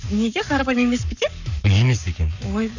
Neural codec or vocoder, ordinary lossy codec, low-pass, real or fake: codec, 44.1 kHz, 7.8 kbps, Pupu-Codec; none; 7.2 kHz; fake